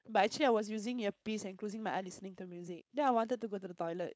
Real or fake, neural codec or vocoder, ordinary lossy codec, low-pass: fake; codec, 16 kHz, 4.8 kbps, FACodec; none; none